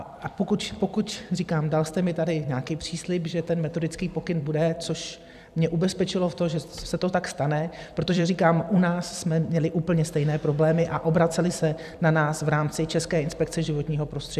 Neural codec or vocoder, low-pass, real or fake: vocoder, 44.1 kHz, 128 mel bands every 256 samples, BigVGAN v2; 14.4 kHz; fake